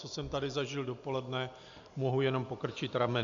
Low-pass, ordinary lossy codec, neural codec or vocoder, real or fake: 7.2 kHz; AAC, 64 kbps; none; real